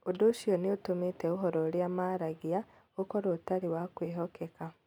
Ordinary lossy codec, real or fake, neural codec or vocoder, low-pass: none; real; none; 19.8 kHz